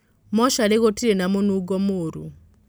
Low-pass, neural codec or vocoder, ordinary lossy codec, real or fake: none; none; none; real